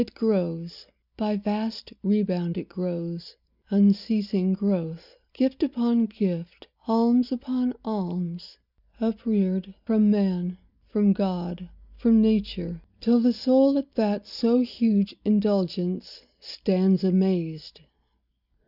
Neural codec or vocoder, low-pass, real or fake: none; 5.4 kHz; real